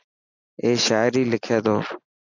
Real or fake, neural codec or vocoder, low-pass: real; none; 7.2 kHz